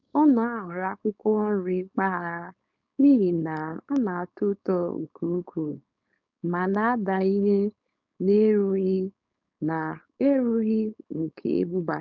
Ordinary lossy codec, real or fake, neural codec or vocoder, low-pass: none; fake; codec, 16 kHz, 4.8 kbps, FACodec; 7.2 kHz